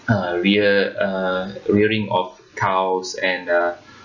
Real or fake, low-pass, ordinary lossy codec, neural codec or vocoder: real; 7.2 kHz; none; none